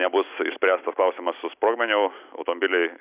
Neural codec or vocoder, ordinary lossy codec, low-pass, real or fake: none; Opus, 64 kbps; 3.6 kHz; real